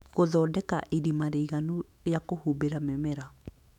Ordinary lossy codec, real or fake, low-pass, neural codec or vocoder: none; fake; 19.8 kHz; autoencoder, 48 kHz, 128 numbers a frame, DAC-VAE, trained on Japanese speech